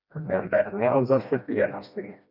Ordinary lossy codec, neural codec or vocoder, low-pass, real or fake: none; codec, 16 kHz, 1 kbps, FreqCodec, smaller model; 5.4 kHz; fake